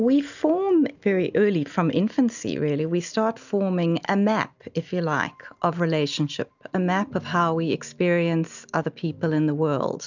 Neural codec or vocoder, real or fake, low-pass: none; real; 7.2 kHz